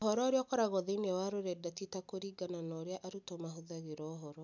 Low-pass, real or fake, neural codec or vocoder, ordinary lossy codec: 7.2 kHz; real; none; none